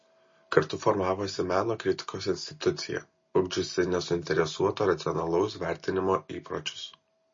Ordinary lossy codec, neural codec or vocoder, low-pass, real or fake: MP3, 32 kbps; none; 7.2 kHz; real